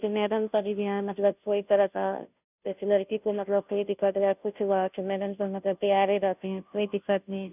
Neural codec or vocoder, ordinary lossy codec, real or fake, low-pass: codec, 16 kHz, 0.5 kbps, FunCodec, trained on Chinese and English, 25 frames a second; none; fake; 3.6 kHz